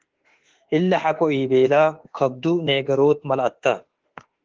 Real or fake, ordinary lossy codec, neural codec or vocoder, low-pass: fake; Opus, 16 kbps; autoencoder, 48 kHz, 32 numbers a frame, DAC-VAE, trained on Japanese speech; 7.2 kHz